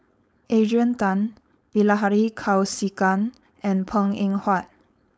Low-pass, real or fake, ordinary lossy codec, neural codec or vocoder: none; fake; none; codec, 16 kHz, 4.8 kbps, FACodec